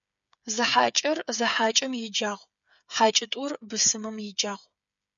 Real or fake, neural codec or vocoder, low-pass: fake; codec, 16 kHz, 8 kbps, FreqCodec, smaller model; 7.2 kHz